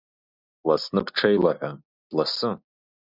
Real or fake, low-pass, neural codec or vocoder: real; 5.4 kHz; none